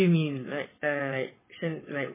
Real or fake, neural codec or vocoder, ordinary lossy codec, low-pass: fake; vocoder, 22.05 kHz, 80 mel bands, WaveNeXt; MP3, 16 kbps; 3.6 kHz